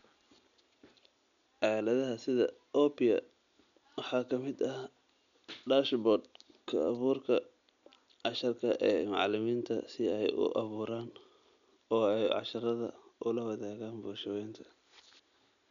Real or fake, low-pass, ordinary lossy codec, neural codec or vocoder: real; 7.2 kHz; none; none